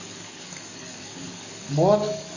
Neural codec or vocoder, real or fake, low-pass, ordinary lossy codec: codec, 44.1 kHz, 7.8 kbps, DAC; fake; 7.2 kHz; none